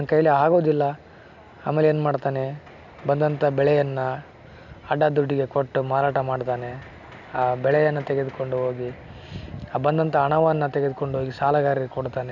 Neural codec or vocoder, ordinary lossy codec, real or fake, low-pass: none; none; real; 7.2 kHz